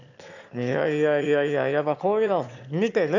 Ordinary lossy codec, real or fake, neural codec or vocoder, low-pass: none; fake; autoencoder, 22.05 kHz, a latent of 192 numbers a frame, VITS, trained on one speaker; 7.2 kHz